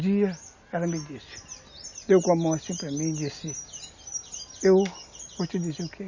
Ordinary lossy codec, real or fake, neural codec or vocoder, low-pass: Opus, 64 kbps; real; none; 7.2 kHz